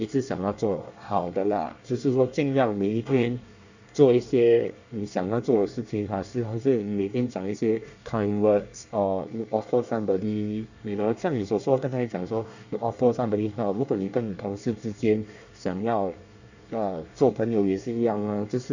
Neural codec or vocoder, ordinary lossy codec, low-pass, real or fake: codec, 24 kHz, 1 kbps, SNAC; none; 7.2 kHz; fake